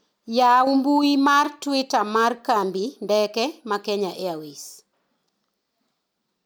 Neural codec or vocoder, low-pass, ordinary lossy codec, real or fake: none; none; none; real